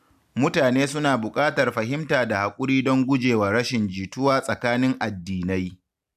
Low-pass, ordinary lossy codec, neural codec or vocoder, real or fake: 14.4 kHz; none; none; real